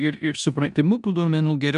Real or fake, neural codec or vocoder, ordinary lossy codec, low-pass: fake; codec, 16 kHz in and 24 kHz out, 0.9 kbps, LongCat-Audio-Codec, four codebook decoder; MP3, 96 kbps; 10.8 kHz